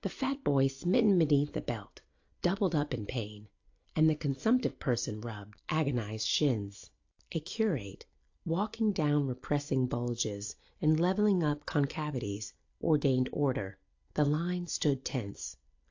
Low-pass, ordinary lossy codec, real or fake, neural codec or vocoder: 7.2 kHz; AAC, 48 kbps; real; none